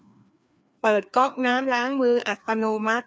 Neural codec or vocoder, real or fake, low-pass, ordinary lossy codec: codec, 16 kHz, 2 kbps, FreqCodec, larger model; fake; none; none